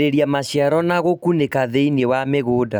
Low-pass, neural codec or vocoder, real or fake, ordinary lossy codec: none; none; real; none